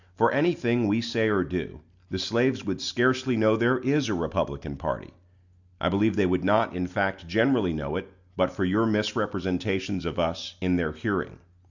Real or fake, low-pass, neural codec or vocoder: real; 7.2 kHz; none